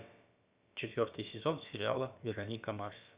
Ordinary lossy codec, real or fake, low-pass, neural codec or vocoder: Opus, 64 kbps; fake; 3.6 kHz; codec, 16 kHz, about 1 kbps, DyCAST, with the encoder's durations